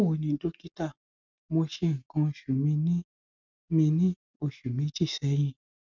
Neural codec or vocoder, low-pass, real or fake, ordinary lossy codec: none; 7.2 kHz; real; none